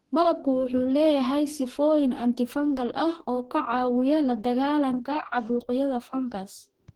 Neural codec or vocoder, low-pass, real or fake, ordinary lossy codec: codec, 44.1 kHz, 2.6 kbps, DAC; 19.8 kHz; fake; Opus, 16 kbps